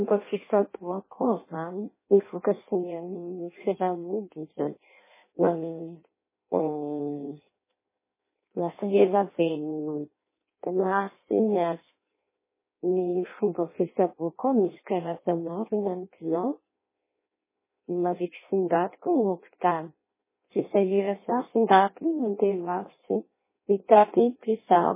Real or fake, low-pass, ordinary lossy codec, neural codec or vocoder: fake; 3.6 kHz; MP3, 16 kbps; codec, 16 kHz in and 24 kHz out, 0.6 kbps, FireRedTTS-2 codec